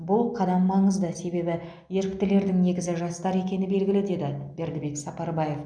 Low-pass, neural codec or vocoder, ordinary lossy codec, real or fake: 9.9 kHz; none; none; real